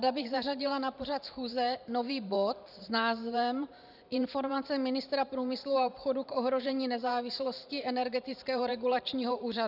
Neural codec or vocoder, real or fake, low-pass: vocoder, 44.1 kHz, 128 mel bands every 512 samples, BigVGAN v2; fake; 5.4 kHz